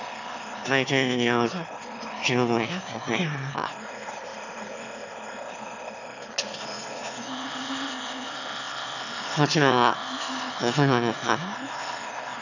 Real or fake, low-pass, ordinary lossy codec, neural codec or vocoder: fake; 7.2 kHz; none; autoencoder, 22.05 kHz, a latent of 192 numbers a frame, VITS, trained on one speaker